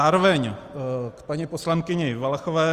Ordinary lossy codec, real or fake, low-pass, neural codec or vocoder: Opus, 32 kbps; real; 14.4 kHz; none